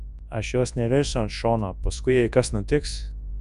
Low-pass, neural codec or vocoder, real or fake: 10.8 kHz; codec, 24 kHz, 0.9 kbps, WavTokenizer, large speech release; fake